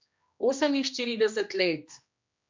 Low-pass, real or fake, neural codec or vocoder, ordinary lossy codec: 7.2 kHz; fake; codec, 16 kHz, 1 kbps, X-Codec, HuBERT features, trained on general audio; MP3, 64 kbps